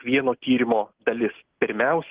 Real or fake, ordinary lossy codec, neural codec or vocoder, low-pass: real; Opus, 16 kbps; none; 3.6 kHz